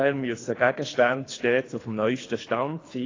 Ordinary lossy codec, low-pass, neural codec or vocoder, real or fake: AAC, 32 kbps; 7.2 kHz; codec, 24 kHz, 3 kbps, HILCodec; fake